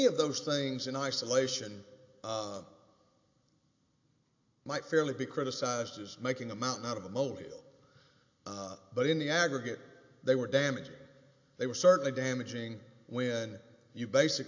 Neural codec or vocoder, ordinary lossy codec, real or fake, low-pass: none; AAC, 48 kbps; real; 7.2 kHz